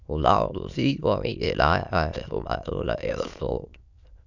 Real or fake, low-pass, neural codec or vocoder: fake; 7.2 kHz; autoencoder, 22.05 kHz, a latent of 192 numbers a frame, VITS, trained on many speakers